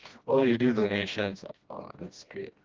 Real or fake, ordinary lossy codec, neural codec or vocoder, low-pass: fake; Opus, 32 kbps; codec, 16 kHz, 1 kbps, FreqCodec, smaller model; 7.2 kHz